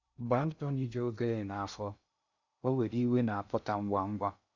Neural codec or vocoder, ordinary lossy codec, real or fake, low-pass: codec, 16 kHz in and 24 kHz out, 0.6 kbps, FocalCodec, streaming, 2048 codes; Opus, 64 kbps; fake; 7.2 kHz